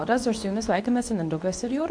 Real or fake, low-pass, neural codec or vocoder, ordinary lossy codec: fake; 9.9 kHz; codec, 24 kHz, 0.9 kbps, WavTokenizer, medium speech release version 2; AAC, 64 kbps